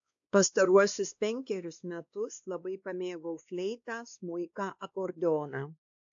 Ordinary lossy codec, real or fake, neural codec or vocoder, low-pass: AAC, 64 kbps; fake; codec, 16 kHz, 2 kbps, X-Codec, WavLM features, trained on Multilingual LibriSpeech; 7.2 kHz